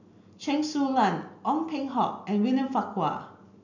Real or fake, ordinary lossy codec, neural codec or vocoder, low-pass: real; none; none; 7.2 kHz